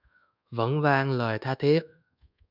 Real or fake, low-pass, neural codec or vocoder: fake; 5.4 kHz; codec, 24 kHz, 1.2 kbps, DualCodec